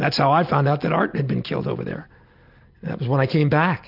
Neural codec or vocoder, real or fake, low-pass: none; real; 5.4 kHz